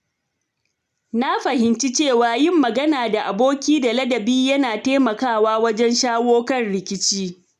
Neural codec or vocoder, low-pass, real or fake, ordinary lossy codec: none; 14.4 kHz; real; none